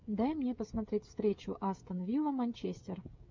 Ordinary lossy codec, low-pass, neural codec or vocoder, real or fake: MP3, 64 kbps; 7.2 kHz; codec, 16 kHz, 16 kbps, FreqCodec, smaller model; fake